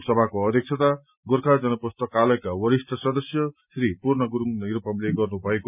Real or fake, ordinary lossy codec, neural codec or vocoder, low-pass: real; none; none; 3.6 kHz